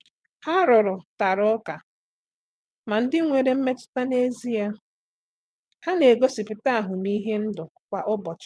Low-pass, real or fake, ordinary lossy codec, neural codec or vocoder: none; fake; none; vocoder, 22.05 kHz, 80 mel bands, WaveNeXt